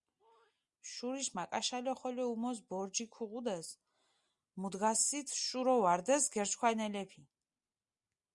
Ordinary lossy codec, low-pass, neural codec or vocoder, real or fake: Opus, 64 kbps; 10.8 kHz; none; real